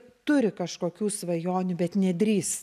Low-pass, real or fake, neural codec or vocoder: 14.4 kHz; real; none